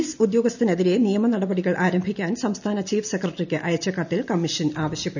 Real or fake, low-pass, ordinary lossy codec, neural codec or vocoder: real; 7.2 kHz; none; none